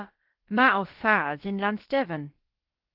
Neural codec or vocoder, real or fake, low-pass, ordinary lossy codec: codec, 16 kHz, about 1 kbps, DyCAST, with the encoder's durations; fake; 5.4 kHz; Opus, 16 kbps